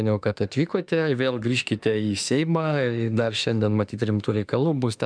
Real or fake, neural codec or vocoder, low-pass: fake; autoencoder, 48 kHz, 32 numbers a frame, DAC-VAE, trained on Japanese speech; 9.9 kHz